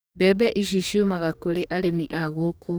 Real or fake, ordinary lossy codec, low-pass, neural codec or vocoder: fake; none; none; codec, 44.1 kHz, 2.6 kbps, DAC